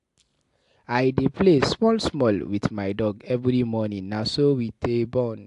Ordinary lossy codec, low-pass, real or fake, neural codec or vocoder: AAC, 64 kbps; 10.8 kHz; real; none